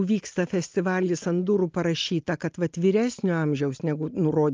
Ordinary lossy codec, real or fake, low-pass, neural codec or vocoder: Opus, 24 kbps; real; 7.2 kHz; none